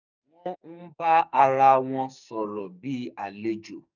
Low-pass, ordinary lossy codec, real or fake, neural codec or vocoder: 7.2 kHz; none; fake; codec, 44.1 kHz, 2.6 kbps, SNAC